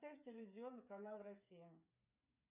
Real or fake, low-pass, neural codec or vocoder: fake; 3.6 kHz; codec, 16 kHz, 8 kbps, FreqCodec, smaller model